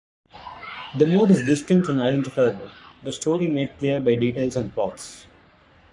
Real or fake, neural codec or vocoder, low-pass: fake; codec, 44.1 kHz, 3.4 kbps, Pupu-Codec; 10.8 kHz